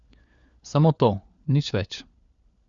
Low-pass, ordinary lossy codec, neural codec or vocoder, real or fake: 7.2 kHz; Opus, 64 kbps; codec, 16 kHz, 4 kbps, FunCodec, trained on LibriTTS, 50 frames a second; fake